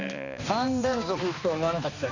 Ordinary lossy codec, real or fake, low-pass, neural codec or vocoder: none; fake; 7.2 kHz; codec, 16 kHz, 2 kbps, X-Codec, HuBERT features, trained on balanced general audio